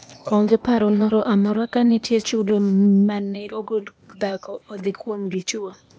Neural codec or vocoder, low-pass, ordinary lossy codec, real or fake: codec, 16 kHz, 0.8 kbps, ZipCodec; none; none; fake